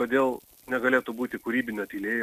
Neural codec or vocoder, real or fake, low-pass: none; real; 14.4 kHz